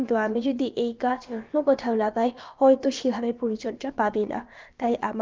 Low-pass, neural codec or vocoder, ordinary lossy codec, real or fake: 7.2 kHz; codec, 16 kHz, about 1 kbps, DyCAST, with the encoder's durations; Opus, 24 kbps; fake